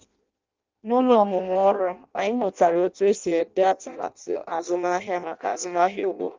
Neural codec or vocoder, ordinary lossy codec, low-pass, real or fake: codec, 16 kHz in and 24 kHz out, 0.6 kbps, FireRedTTS-2 codec; Opus, 24 kbps; 7.2 kHz; fake